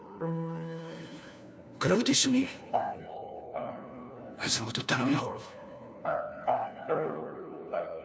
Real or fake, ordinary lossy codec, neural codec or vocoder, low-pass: fake; none; codec, 16 kHz, 1 kbps, FunCodec, trained on LibriTTS, 50 frames a second; none